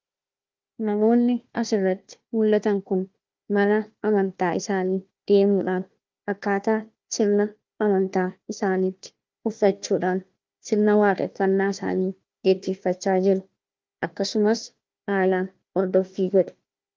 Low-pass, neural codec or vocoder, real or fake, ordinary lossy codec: 7.2 kHz; codec, 16 kHz, 1 kbps, FunCodec, trained on Chinese and English, 50 frames a second; fake; Opus, 24 kbps